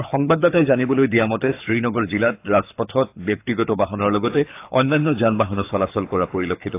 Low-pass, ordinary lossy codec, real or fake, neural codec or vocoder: 3.6 kHz; AAC, 24 kbps; fake; codec, 24 kHz, 6 kbps, HILCodec